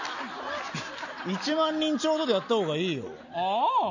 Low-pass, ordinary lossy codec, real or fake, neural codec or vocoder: 7.2 kHz; none; real; none